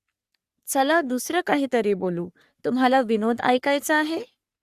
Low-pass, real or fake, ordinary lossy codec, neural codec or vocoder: 14.4 kHz; fake; Opus, 64 kbps; codec, 44.1 kHz, 3.4 kbps, Pupu-Codec